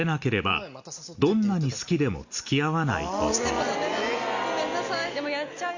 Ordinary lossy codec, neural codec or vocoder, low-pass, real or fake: Opus, 64 kbps; none; 7.2 kHz; real